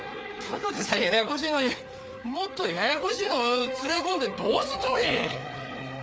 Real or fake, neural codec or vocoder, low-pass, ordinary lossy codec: fake; codec, 16 kHz, 4 kbps, FreqCodec, larger model; none; none